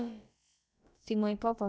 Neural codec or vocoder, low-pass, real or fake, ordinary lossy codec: codec, 16 kHz, about 1 kbps, DyCAST, with the encoder's durations; none; fake; none